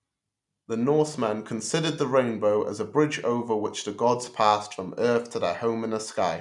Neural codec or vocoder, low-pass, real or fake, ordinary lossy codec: none; 10.8 kHz; real; none